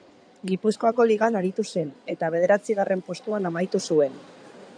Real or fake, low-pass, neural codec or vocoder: fake; 9.9 kHz; codec, 16 kHz in and 24 kHz out, 2.2 kbps, FireRedTTS-2 codec